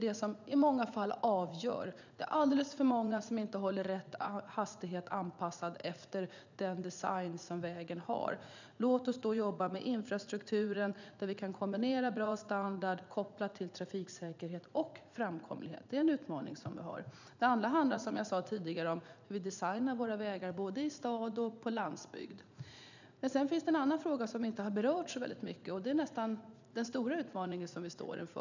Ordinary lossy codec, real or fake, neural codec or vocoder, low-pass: none; fake; vocoder, 44.1 kHz, 80 mel bands, Vocos; 7.2 kHz